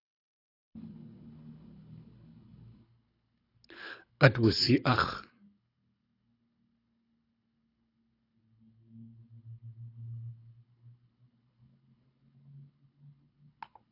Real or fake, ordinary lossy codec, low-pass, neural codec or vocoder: fake; AAC, 32 kbps; 5.4 kHz; codec, 24 kHz, 6 kbps, HILCodec